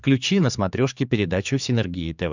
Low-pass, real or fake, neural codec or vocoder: 7.2 kHz; fake; codec, 16 kHz, 4 kbps, X-Codec, HuBERT features, trained on general audio